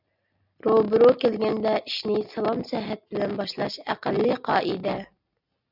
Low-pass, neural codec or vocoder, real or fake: 5.4 kHz; none; real